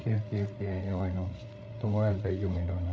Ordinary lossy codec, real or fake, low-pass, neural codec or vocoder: none; fake; none; codec, 16 kHz, 8 kbps, FreqCodec, larger model